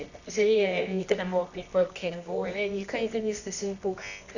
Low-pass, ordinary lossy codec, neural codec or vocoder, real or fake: 7.2 kHz; none; codec, 24 kHz, 0.9 kbps, WavTokenizer, medium music audio release; fake